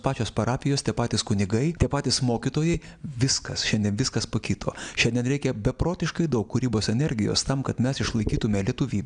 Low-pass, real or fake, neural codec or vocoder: 9.9 kHz; real; none